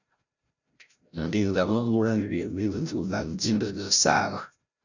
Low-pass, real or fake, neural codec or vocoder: 7.2 kHz; fake; codec, 16 kHz, 0.5 kbps, FreqCodec, larger model